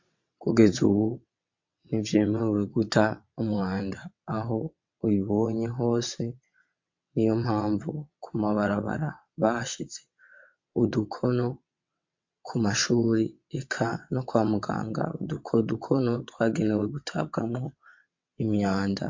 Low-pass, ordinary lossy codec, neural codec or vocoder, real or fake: 7.2 kHz; MP3, 48 kbps; vocoder, 22.05 kHz, 80 mel bands, WaveNeXt; fake